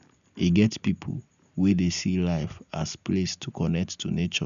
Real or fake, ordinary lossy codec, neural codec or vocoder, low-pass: real; none; none; 7.2 kHz